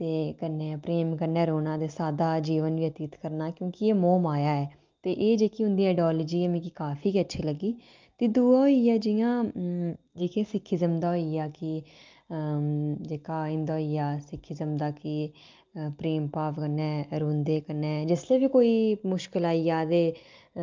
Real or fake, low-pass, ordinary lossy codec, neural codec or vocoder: real; 7.2 kHz; Opus, 24 kbps; none